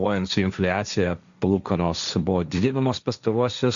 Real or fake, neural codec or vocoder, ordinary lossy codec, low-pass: fake; codec, 16 kHz, 1.1 kbps, Voila-Tokenizer; Opus, 64 kbps; 7.2 kHz